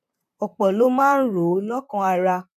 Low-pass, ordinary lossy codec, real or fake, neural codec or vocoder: 14.4 kHz; none; fake; vocoder, 44.1 kHz, 128 mel bands, Pupu-Vocoder